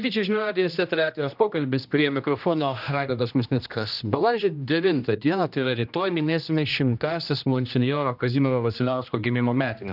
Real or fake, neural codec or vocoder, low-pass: fake; codec, 16 kHz, 1 kbps, X-Codec, HuBERT features, trained on general audio; 5.4 kHz